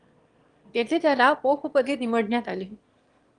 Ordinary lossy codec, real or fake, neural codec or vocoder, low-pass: Opus, 24 kbps; fake; autoencoder, 22.05 kHz, a latent of 192 numbers a frame, VITS, trained on one speaker; 9.9 kHz